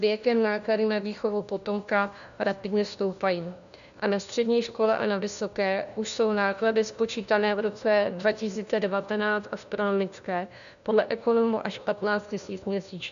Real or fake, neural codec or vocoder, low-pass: fake; codec, 16 kHz, 1 kbps, FunCodec, trained on LibriTTS, 50 frames a second; 7.2 kHz